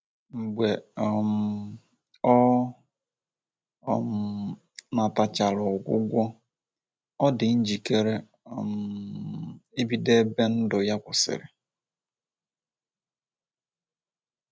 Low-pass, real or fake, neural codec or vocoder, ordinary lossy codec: none; real; none; none